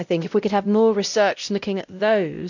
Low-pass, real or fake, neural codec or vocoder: 7.2 kHz; fake; codec, 16 kHz, 0.5 kbps, X-Codec, WavLM features, trained on Multilingual LibriSpeech